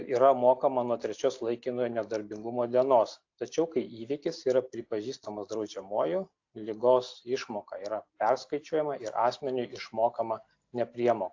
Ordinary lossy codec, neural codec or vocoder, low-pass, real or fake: AAC, 48 kbps; none; 7.2 kHz; real